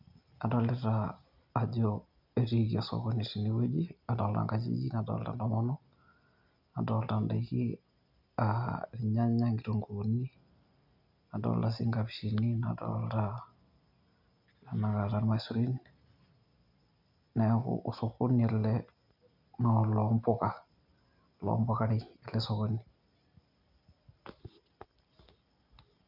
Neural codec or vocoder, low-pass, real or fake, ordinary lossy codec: none; 5.4 kHz; real; none